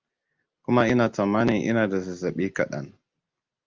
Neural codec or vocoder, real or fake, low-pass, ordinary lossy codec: none; real; 7.2 kHz; Opus, 24 kbps